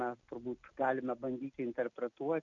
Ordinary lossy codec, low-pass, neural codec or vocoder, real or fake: MP3, 64 kbps; 7.2 kHz; none; real